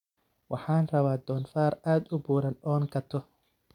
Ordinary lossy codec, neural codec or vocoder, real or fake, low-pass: none; none; real; 19.8 kHz